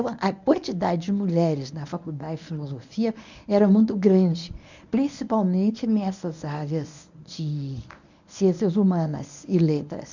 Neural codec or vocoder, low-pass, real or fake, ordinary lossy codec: codec, 24 kHz, 0.9 kbps, WavTokenizer, medium speech release version 1; 7.2 kHz; fake; none